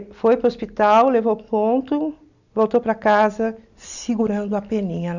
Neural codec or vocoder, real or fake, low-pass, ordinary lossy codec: none; real; 7.2 kHz; none